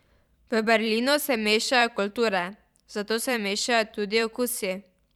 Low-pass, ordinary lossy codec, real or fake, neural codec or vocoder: 19.8 kHz; none; fake; vocoder, 44.1 kHz, 128 mel bands every 512 samples, BigVGAN v2